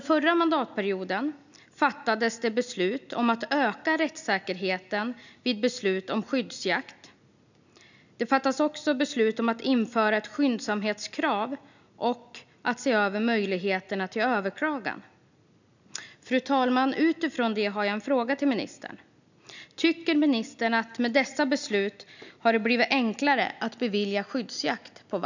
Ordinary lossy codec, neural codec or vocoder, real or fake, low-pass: none; none; real; 7.2 kHz